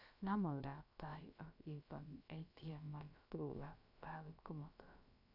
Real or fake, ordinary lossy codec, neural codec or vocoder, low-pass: fake; none; codec, 16 kHz, about 1 kbps, DyCAST, with the encoder's durations; 5.4 kHz